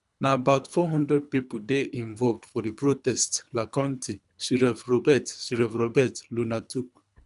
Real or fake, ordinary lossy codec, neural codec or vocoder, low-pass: fake; none; codec, 24 kHz, 3 kbps, HILCodec; 10.8 kHz